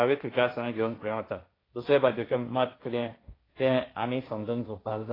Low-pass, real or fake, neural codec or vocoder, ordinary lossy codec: 5.4 kHz; fake; codec, 16 kHz, 1.1 kbps, Voila-Tokenizer; AAC, 24 kbps